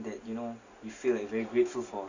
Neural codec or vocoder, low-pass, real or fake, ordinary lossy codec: none; 7.2 kHz; real; Opus, 64 kbps